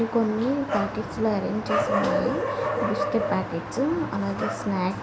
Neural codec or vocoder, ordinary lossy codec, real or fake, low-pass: none; none; real; none